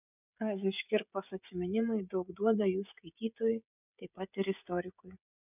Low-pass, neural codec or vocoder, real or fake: 3.6 kHz; codec, 16 kHz, 16 kbps, FreqCodec, smaller model; fake